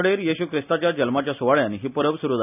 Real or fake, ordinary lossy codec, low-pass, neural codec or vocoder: real; none; 3.6 kHz; none